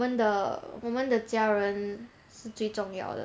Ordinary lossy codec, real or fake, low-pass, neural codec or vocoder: none; real; none; none